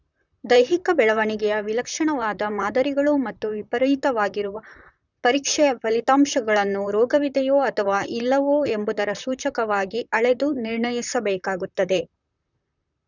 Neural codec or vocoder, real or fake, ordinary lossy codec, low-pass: vocoder, 44.1 kHz, 128 mel bands, Pupu-Vocoder; fake; none; 7.2 kHz